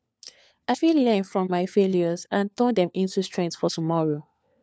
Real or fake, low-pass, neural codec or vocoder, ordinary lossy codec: fake; none; codec, 16 kHz, 4 kbps, FunCodec, trained on LibriTTS, 50 frames a second; none